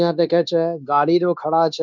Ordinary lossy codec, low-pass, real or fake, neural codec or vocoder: none; none; fake; codec, 16 kHz, 0.9 kbps, LongCat-Audio-Codec